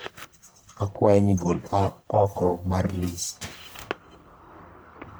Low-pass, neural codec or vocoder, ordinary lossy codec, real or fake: none; codec, 44.1 kHz, 1.7 kbps, Pupu-Codec; none; fake